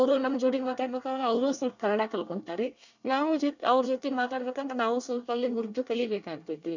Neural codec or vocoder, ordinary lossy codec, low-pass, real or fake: codec, 24 kHz, 1 kbps, SNAC; none; 7.2 kHz; fake